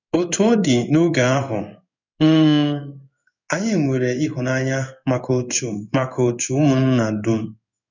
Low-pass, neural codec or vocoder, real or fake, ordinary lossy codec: 7.2 kHz; codec, 16 kHz in and 24 kHz out, 1 kbps, XY-Tokenizer; fake; none